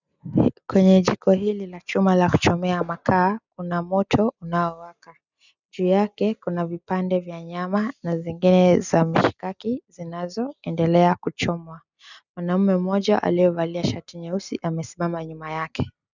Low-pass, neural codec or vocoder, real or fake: 7.2 kHz; none; real